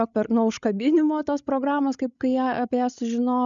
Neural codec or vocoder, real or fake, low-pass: codec, 16 kHz, 16 kbps, FreqCodec, larger model; fake; 7.2 kHz